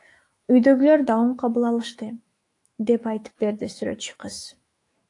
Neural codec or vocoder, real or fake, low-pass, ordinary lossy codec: autoencoder, 48 kHz, 128 numbers a frame, DAC-VAE, trained on Japanese speech; fake; 10.8 kHz; AAC, 48 kbps